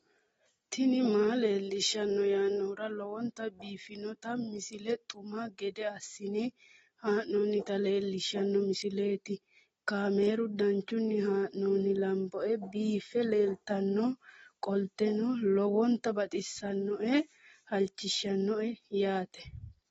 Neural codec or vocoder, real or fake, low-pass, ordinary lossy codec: none; real; 19.8 kHz; AAC, 24 kbps